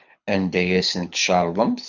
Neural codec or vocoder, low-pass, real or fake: codec, 24 kHz, 6 kbps, HILCodec; 7.2 kHz; fake